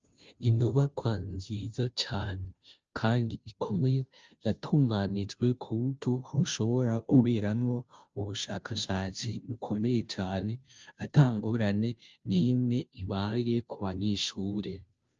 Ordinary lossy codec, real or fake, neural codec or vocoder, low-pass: Opus, 24 kbps; fake; codec, 16 kHz, 0.5 kbps, FunCodec, trained on Chinese and English, 25 frames a second; 7.2 kHz